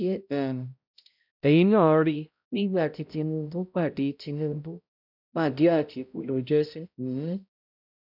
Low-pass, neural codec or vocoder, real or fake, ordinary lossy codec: 5.4 kHz; codec, 16 kHz, 0.5 kbps, X-Codec, HuBERT features, trained on balanced general audio; fake; none